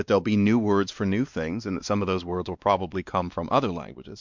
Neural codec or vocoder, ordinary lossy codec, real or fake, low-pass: codec, 16 kHz, 2 kbps, X-Codec, WavLM features, trained on Multilingual LibriSpeech; MP3, 64 kbps; fake; 7.2 kHz